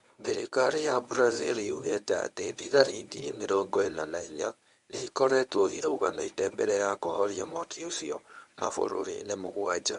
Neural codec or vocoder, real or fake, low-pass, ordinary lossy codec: codec, 24 kHz, 0.9 kbps, WavTokenizer, medium speech release version 1; fake; 10.8 kHz; none